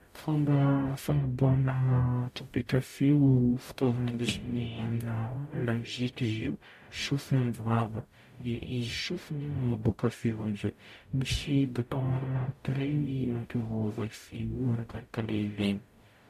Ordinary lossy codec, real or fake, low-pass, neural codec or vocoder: MP3, 64 kbps; fake; 14.4 kHz; codec, 44.1 kHz, 0.9 kbps, DAC